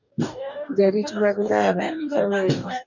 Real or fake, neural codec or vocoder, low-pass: fake; codec, 44.1 kHz, 2.6 kbps, DAC; 7.2 kHz